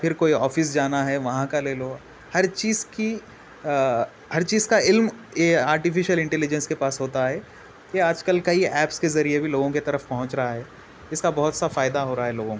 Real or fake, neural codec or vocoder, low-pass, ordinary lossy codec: real; none; none; none